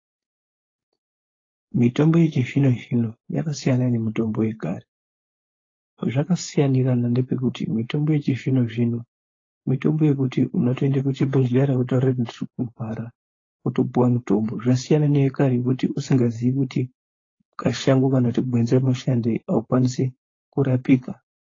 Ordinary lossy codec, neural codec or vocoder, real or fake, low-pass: AAC, 32 kbps; codec, 16 kHz, 4.8 kbps, FACodec; fake; 7.2 kHz